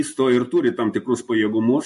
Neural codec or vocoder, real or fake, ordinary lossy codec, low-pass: none; real; MP3, 48 kbps; 14.4 kHz